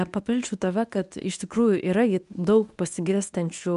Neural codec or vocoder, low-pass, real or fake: codec, 24 kHz, 0.9 kbps, WavTokenizer, medium speech release version 1; 10.8 kHz; fake